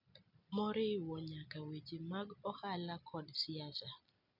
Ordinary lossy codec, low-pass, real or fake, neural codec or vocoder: none; 5.4 kHz; real; none